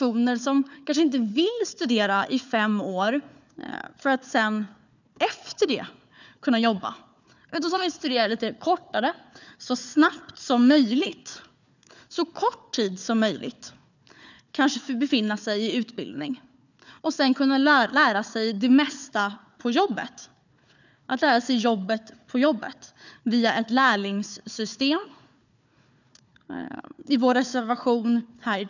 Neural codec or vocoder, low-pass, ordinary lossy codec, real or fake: codec, 16 kHz, 4 kbps, FunCodec, trained on Chinese and English, 50 frames a second; 7.2 kHz; none; fake